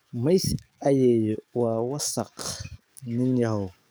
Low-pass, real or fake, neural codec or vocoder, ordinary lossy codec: none; fake; codec, 44.1 kHz, 7.8 kbps, DAC; none